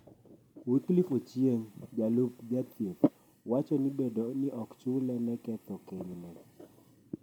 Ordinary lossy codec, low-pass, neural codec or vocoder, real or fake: none; 19.8 kHz; vocoder, 44.1 kHz, 128 mel bands every 256 samples, BigVGAN v2; fake